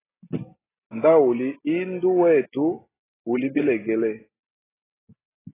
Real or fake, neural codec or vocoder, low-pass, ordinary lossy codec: real; none; 3.6 kHz; AAC, 16 kbps